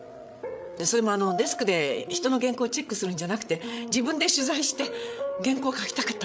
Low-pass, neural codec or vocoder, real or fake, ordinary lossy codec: none; codec, 16 kHz, 8 kbps, FreqCodec, larger model; fake; none